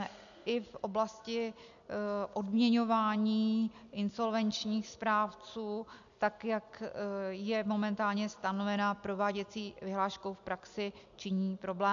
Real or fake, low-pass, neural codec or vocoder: real; 7.2 kHz; none